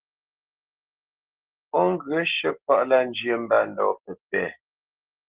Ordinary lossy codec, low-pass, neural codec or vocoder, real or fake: Opus, 16 kbps; 3.6 kHz; none; real